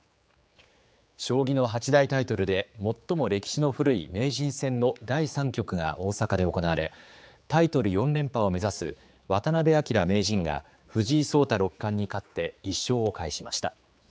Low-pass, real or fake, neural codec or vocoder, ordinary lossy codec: none; fake; codec, 16 kHz, 4 kbps, X-Codec, HuBERT features, trained on general audio; none